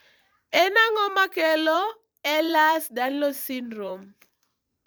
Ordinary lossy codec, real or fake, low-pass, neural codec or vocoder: none; fake; none; vocoder, 44.1 kHz, 128 mel bands every 512 samples, BigVGAN v2